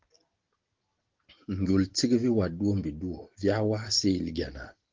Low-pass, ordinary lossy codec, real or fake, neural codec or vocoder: 7.2 kHz; Opus, 16 kbps; real; none